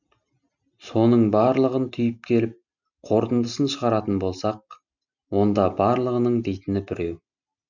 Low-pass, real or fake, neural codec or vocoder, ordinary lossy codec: 7.2 kHz; real; none; none